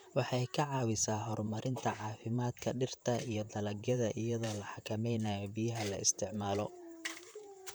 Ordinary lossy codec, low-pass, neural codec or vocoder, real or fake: none; none; vocoder, 44.1 kHz, 128 mel bands, Pupu-Vocoder; fake